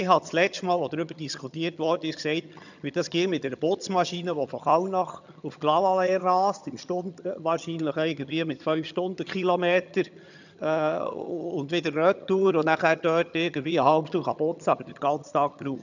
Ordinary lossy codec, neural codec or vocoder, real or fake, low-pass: none; vocoder, 22.05 kHz, 80 mel bands, HiFi-GAN; fake; 7.2 kHz